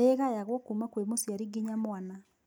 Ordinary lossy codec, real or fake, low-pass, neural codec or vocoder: none; real; none; none